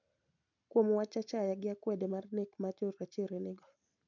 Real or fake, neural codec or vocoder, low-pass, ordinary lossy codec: real; none; 7.2 kHz; none